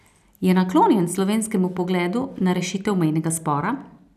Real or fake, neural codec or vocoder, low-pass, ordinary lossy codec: real; none; 14.4 kHz; none